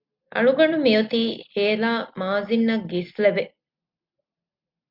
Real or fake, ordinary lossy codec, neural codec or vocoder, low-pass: real; MP3, 48 kbps; none; 5.4 kHz